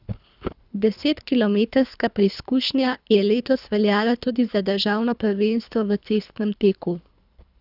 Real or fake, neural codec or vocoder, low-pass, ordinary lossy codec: fake; codec, 24 kHz, 3 kbps, HILCodec; 5.4 kHz; none